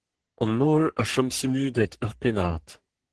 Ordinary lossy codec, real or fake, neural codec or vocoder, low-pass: Opus, 16 kbps; fake; codec, 44.1 kHz, 3.4 kbps, Pupu-Codec; 10.8 kHz